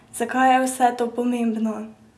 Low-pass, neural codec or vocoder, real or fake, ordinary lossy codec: none; none; real; none